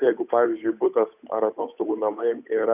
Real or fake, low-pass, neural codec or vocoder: fake; 3.6 kHz; codec, 16 kHz, 8 kbps, FunCodec, trained on Chinese and English, 25 frames a second